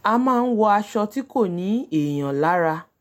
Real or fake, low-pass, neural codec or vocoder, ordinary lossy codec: real; 19.8 kHz; none; MP3, 64 kbps